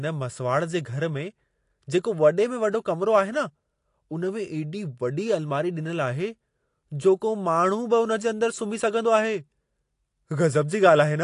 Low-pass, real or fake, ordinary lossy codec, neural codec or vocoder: 10.8 kHz; real; AAC, 48 kbps; none